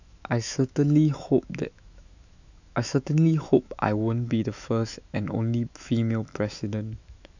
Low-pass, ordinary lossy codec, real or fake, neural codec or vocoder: 7.2 kHz; none; fake; autoencoder, 48 kHz, 128 numbers a frame, DAC-VAE, trained on Japanese speech